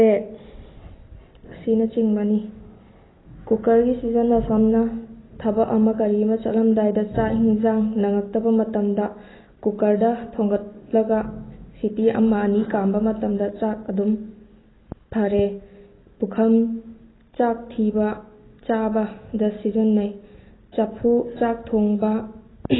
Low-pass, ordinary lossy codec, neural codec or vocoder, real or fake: 7.2 kHz; AAC, 16 kbps; none; real